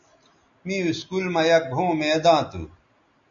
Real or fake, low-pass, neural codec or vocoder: real; 7.2 kHz; none